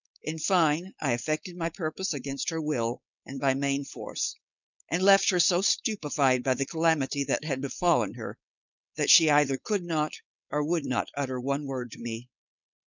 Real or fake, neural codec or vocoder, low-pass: fake; codec, 16 kHz, 4.8 kbps, FACodec; 7.2 kHz